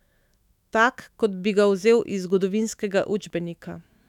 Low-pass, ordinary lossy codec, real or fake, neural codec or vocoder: 19.8 kHz; none; fake; autoencoder, 48 kHz, 128 numbers a frame, DAC-VAE, trained on Japanese speech